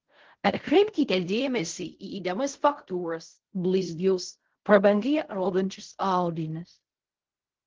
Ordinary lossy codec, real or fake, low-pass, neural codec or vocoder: Opus, 16 kbps; fake; 7.2 kHz; codec, 16 kHz in and 24 kHz out, 0.4 kbps, LongCat-Audio-Codec, fine tuned four codebook decoder